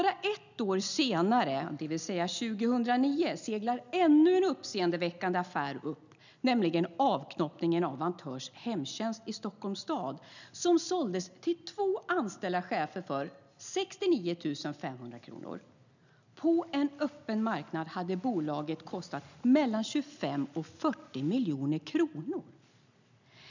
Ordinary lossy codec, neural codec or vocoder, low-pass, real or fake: none; none; 7.2 kHz; real